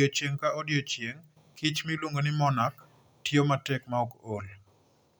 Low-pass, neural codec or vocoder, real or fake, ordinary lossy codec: none; none; real; none